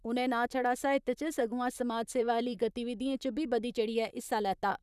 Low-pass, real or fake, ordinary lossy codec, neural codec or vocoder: 14.4 kHz; fake; none; vocoder, 44.1 kHz, 128 mel bands, Pupu-Vocoder